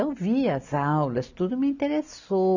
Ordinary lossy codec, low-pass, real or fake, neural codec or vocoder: none; 7.2 kHz; real; none